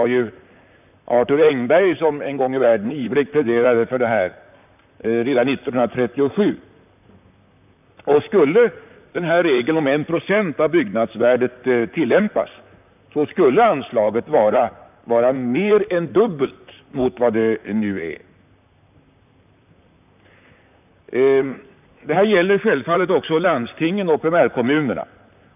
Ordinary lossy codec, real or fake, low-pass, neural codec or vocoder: none; fake; 3.6 kHz; vocoder, 44.1 kHz, 128 mel bands, Pupu-Vocoder